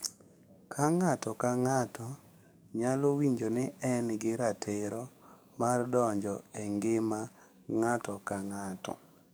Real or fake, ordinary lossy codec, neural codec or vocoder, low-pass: fake; none; codec, 44.1 kHz, 7.8 kbps, DAC; none